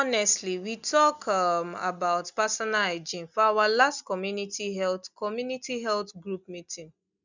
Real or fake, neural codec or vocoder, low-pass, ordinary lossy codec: real; none; 7.2 kHz; none